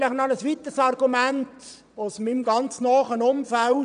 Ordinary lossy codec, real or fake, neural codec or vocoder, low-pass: none; fake; vocoder, 22.05 kHz, 80 mel bands, Vocos; 9.9 kHz